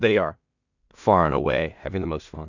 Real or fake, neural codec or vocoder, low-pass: fake; codec, 16 kHz in and 24 kHz out, 0.4 kbps, LongCat-Audio-Codec, two codebook decoder; 7.2 kHz